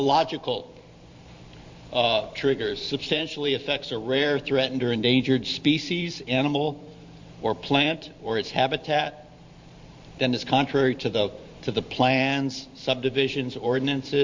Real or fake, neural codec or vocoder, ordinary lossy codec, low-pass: fake; vocoder, 44.1 kHz, 128 mel bands every 512 samples, BigVGAN v2; MP3, 48 kbps; 7.2 kHz